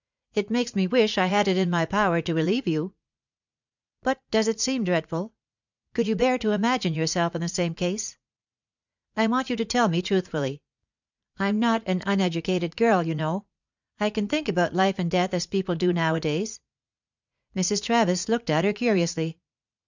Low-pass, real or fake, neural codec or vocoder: 7.2 kHz; fake; vocoder, 44.1 kHz, 80 mel bands, Vocos